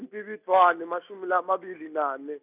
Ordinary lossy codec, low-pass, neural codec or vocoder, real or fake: none; 3.6 kHz; none; real